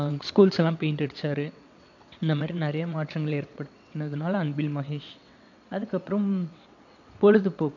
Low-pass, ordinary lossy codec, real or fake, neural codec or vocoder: 7.2 kHz; none; fake; vocoder, 22.05 kHz, 80 mel bands, WaveNeXt